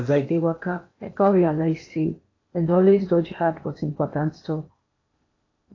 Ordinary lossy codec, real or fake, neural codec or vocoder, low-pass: AAC, 32 kbps; fake; codec, 16 kHz in and 24 kHz out, 0.8 kbps, FocalCodec, streaming, 65536 codes; 7.2 kHz